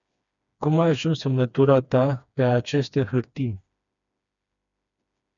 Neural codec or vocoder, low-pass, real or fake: codec, 16 kHz, 2 kbps, FreqCodec, smaller model; 7.2 kHz; fake